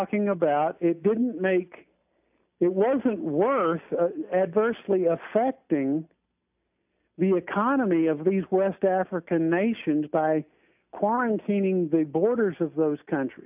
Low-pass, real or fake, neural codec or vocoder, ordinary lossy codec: 3.6 kHz; real; none; AAC, 32 kbps